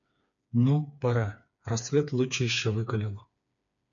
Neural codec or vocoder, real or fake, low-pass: codec, 16 kHz, 4 kbps, FreqCodec, smaller model; fake; 7.2 kHz